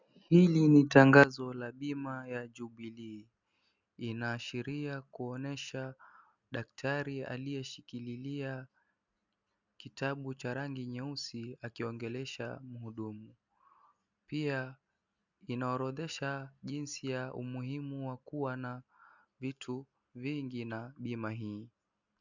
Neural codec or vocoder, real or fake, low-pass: none; real; 7.2 kHz